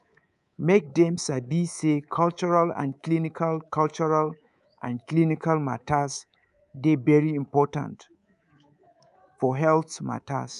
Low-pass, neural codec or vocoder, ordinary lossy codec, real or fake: 10.8 kHz; codec, 24 kHz, 3.1 kbps, DualCodec; none; fake